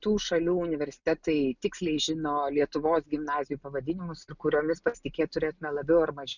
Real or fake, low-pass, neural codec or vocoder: real; 7.2 kHz; none